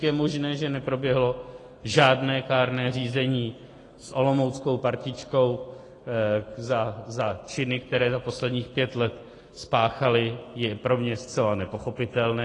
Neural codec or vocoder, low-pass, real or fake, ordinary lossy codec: none; 10.8 kHz; real; AAC, 32 kbps